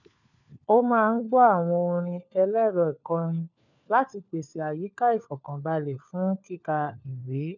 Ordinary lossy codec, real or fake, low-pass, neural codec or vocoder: none; fake; 7.2 kHz; codec, 16 kHz, 4 kbps, FunCodec, trained on LibriTTS, 50 frames a second